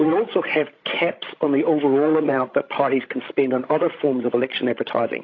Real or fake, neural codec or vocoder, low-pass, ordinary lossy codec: fake; codec, 16 kHz, 16 kbps, FreqCodec, larger model; 7.2 kHz; AAC, 32 kbps